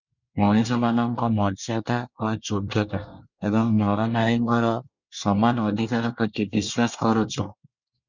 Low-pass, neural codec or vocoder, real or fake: 7.2 kHz; codec, 24 kHz, 1 kbps, SNAC; fake